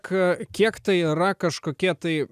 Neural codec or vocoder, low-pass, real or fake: none; 14.4 kHz; real